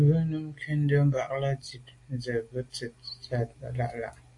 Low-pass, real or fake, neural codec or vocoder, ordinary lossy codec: 10.8 kHz; real; none; AAC, 48 kbps